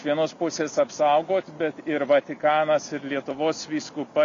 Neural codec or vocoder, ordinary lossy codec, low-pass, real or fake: none; MP3, 48 kbps; 7.2 kHz; real